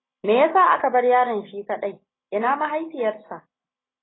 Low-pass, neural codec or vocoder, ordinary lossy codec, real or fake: 7.2 kHz; none; AAC, 16 kbps; real